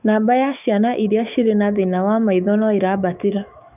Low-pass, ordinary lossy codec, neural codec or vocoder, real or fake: 3.6 kHz; none; autoencoder, 48 kHz, 128 numbers a frame, DAC-VAE, trained on Japanese speech; fake